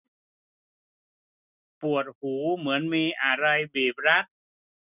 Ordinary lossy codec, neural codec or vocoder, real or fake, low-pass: none; none; real; 3.6 kHz